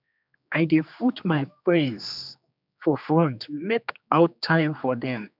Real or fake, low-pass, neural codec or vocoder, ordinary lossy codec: fake; 5.4 kHz; codec, 16 kHz, 2 kbps, X-Codec, HuBERT features, trained on general audio; none